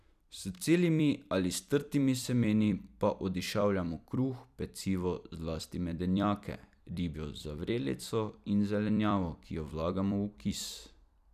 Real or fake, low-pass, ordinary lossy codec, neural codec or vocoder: fake; 14.4 kHz; none; vocoder, 44.1 kHz, 128 mel bands every 256 samples, BigVGAN v2